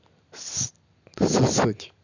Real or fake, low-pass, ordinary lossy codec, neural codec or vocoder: real; 7.2 kHz; none; none